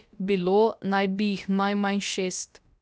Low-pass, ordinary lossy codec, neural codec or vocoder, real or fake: none; none; codec, 16 kHz, about 1 kbps, DyCAST, with the encoder's durations; fake